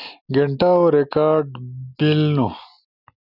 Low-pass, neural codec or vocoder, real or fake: 5.4 kHz; none; real